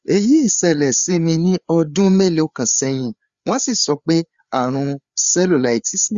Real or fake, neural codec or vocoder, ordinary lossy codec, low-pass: fake; codec, 16 kHz, 4 kbps, FreqCodec, larger model; Opus, 64 kbps; 7.2 kHz